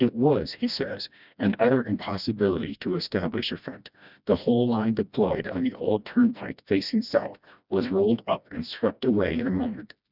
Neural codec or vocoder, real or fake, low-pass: codec, 16 kHz, 1 kbps, FreqCodec, smaller model; fake; 5.4 kHz